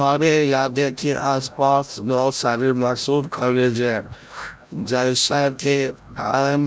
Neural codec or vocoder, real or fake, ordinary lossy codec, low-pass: codec, 16 kHz, 0.5 kbps, FreqCodec, larger model; fake; none; none